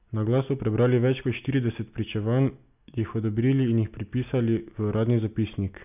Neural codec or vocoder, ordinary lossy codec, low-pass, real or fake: none; none; 3.6 kHz; real